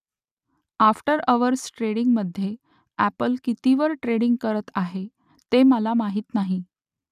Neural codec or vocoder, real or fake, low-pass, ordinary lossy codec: none; real; 14.4 kHz; none